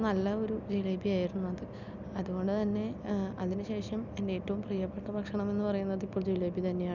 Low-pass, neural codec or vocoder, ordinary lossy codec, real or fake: 7.2 kHz; none; none; real